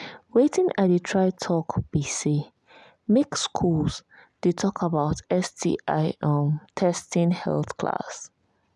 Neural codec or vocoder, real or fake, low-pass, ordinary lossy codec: none; real; none; none